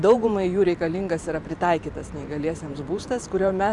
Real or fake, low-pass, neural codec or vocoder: fake; 10.8 kHz; vocoder, 44.1 kHz, 128 mel bands every 512 samples, BigVGAN v2